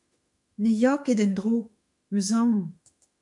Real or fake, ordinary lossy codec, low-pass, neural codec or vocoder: fake; MP3, 96 kbps; 10.8 kHz; autoencoder, 48 kHz, 32 numbers a frame, DAC-VAE, trained on Japanese speech